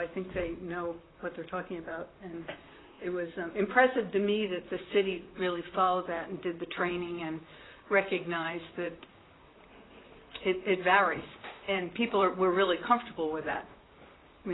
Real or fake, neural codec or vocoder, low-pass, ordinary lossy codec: fake; vocoder, 44.1 kHz, 128 mel bands, Pupu-Vocoder; 7.2 kHz; AAC, 16 kbps